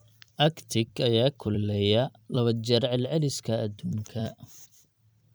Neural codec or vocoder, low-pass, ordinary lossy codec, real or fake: vocoder, 44.1 kHz, 128 mel bands every 256 samples, BigVGAN v2; none; none; fake